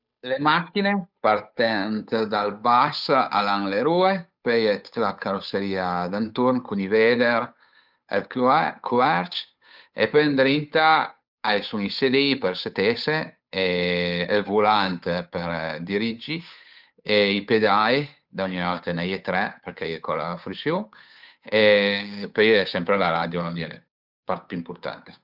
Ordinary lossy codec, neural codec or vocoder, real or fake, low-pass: none; codec, 16 kHz, 8 kbps, FunCodec, trained on Chinese and English, 25 frames a second; fake; 5.4 kHz